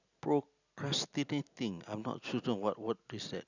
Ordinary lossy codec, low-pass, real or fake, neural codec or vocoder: none; 7.2 kHz; real; none